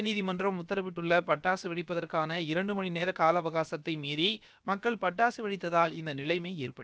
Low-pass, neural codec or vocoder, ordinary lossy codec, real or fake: none; codec, 16 kHz, about 1 kbps, DyCAST, with the encoder's durations; none; fake